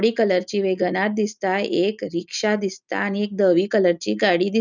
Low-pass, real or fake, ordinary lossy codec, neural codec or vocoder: 7.2 kHz; real; none; none